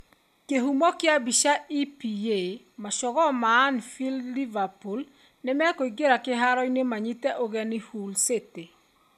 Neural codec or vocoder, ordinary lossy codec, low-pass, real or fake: none; none; 14.4 kHz; real